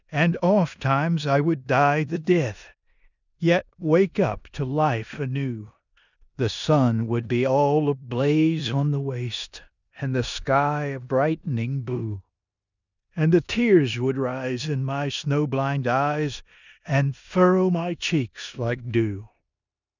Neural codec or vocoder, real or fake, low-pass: codec, 16 kHz in and 24 kHz out, 0.9 kbps, LongCat-Audio-Codec, four codebook decoder; fake; 7.2 kHz